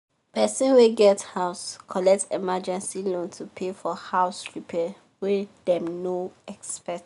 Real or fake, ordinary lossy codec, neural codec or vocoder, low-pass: real; none; none; 10.8 kHz